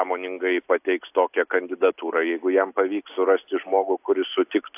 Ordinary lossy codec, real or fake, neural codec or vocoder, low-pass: AAC, 32 kbps; real; none; 3.6 kHz